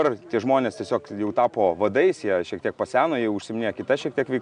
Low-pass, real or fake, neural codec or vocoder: 9.9 kHz; real; none